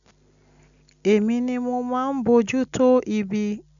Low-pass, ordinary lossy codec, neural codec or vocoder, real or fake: 7.2 kHz; none; none; real